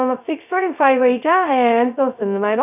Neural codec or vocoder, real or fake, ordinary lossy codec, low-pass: codec, 16 kHz, 0.2 kbps, FocalCodec; fake; none; 3.6 kHz